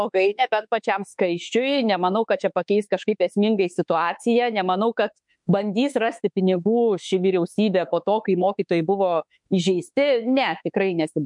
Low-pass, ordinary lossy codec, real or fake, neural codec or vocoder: 10.8 kHz; MP3, 64 kbps; fake; autoencoder, 48 kHz, 32 numbers a frame, DAC-VAE, trained on Japanese speech